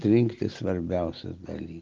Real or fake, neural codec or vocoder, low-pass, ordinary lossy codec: real; none; 7.2 kHz; Opus, 32 kbps